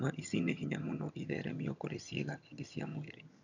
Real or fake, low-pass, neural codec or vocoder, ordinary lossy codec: fake; 7.2 kHz; vocoder, 22.05 kHz, 80 mel bands, HiFi-GAN; none